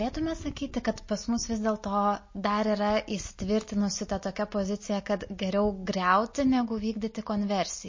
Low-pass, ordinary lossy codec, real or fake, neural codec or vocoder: 7.2 kHz; MP3, 32 kbps; real; none